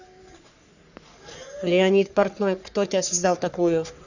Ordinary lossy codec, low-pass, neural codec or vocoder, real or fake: none; 7.2 kHz; codec, 44.1 kHz, 3.4 kbps, Pupu-Codec; fake